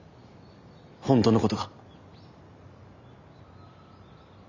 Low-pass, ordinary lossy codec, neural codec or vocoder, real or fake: 7.2 kHz; Opus, 64 kbps; none; real